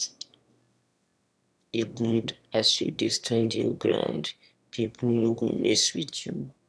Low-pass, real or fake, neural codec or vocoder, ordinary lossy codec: none; fake; autoencoder, 22.05 kHz, a latent of 192 numbers a frame, VITS, trained on one speaker; none